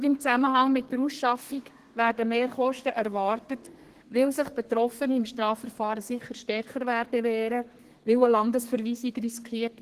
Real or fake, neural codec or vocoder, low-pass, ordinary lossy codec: fake; codec, 32 kHz, 1.9 kbps, SNAC; 14.4 kHz; Opus, 16 kbps